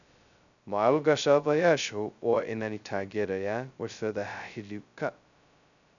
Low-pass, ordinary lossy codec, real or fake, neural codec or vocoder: 7.2 kHz; none; fake; codec, 16 kHz, 0.2 kbps, FocalCodec